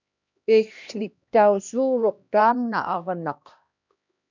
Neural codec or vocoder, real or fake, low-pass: codec, 16 kHz, 1 kbps, X-Codec, HuBERT features, trained on LibriSpeech; fake; 7.2 kHz